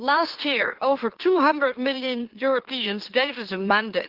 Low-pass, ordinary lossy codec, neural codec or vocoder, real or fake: 5.4 kHz; Opus, 16 kbps; autoencoder, 44.1 kHz, a latent of 192 numbers a frame, MeloTTS; fake